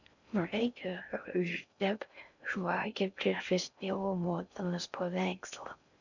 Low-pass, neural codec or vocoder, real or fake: 7.2 kHz; codec, 16 kHz in and 24 kHz out, 0.6 kbps, FocalCodec, streaming, 4096 codes; fake